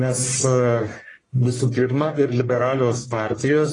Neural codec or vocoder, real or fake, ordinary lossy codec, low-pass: codec, 44.1 kHz, 1.7 kbps, Pupu-Codec; fake; AAC, 32 kbps; 10.8 kHz